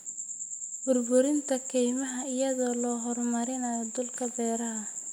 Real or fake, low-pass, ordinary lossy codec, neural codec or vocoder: real; 19.8 kHz; none; none